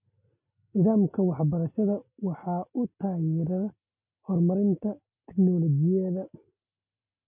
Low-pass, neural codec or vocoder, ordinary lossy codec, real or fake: 3.6 kHz; none; none; real